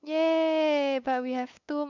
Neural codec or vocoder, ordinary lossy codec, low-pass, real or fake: codec, 16 kHz, 8 kbps, FunCodec, trained on LibriTTS, 25 frames a second; none; 7.2 kHz; fake